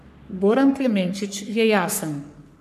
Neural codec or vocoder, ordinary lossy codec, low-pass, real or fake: codec, 44.1 kHz, 3.4 kbps, Pupu-Codec; MP3, 96 kbps; 14.4 kHz; fake